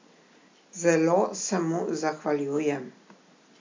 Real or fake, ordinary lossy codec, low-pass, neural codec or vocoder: real; MP3, 64 kbps; 7.2 kHz; none